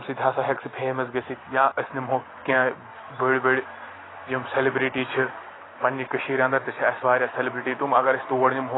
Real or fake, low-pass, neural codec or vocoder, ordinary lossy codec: real; 7.2 kHz; none; AAC, 16 kbps